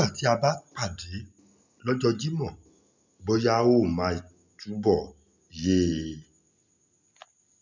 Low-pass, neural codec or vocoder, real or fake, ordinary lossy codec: 7.2 kHz; none; real; none